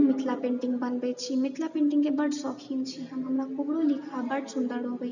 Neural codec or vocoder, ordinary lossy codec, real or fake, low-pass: none; none; real; 7.2 kHz